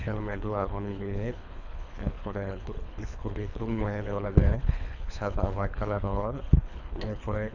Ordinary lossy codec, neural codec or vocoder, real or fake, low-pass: none; codec, 24 kHz, 3 kbps, HILCodec; fake; 7.2 kHz